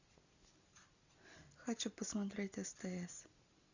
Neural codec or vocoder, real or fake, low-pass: none; real; 7.2 kHz